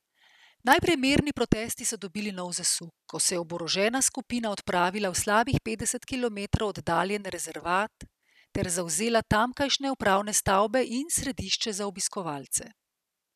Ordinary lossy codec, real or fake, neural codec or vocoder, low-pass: none; real; none; 14.4 kHz